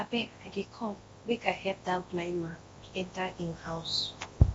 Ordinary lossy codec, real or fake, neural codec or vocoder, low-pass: AAC, 24 kbps; fake; codec, 24 kHz, 0.9 kbps, WavTokenizer, large speech release; 10.8 kHz